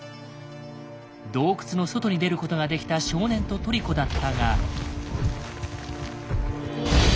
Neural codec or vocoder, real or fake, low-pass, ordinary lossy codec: none; real; none; none